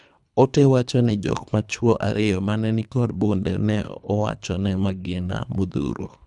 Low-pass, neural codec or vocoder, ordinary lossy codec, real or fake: 10.8 kHz; codec, 24 kHz, 3 kbps, HILCodec; none; fake